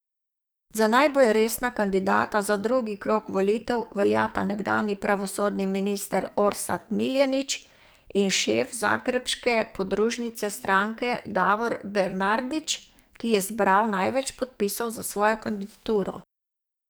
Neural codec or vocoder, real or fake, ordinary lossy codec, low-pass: codec, 44.1 kHz, 2.6 kbps, SNAC; fake; none; none